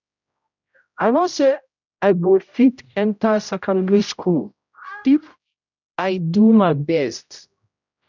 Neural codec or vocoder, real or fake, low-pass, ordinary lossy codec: codec, 16 kHz, 0.5 kbps, X-Codec, HuBERT features, trained on general audio; fake; 7.2 kHz; none